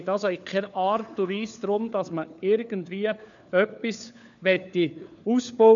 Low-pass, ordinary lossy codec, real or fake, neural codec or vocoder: 7.2 kHz; none; fake; codec, 16 kHz, 4 kbps, FunCodec, trained on LibriTTS, 50 frames a second